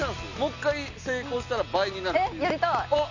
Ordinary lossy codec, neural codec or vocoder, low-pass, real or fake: none; none; 7.2 kHz; real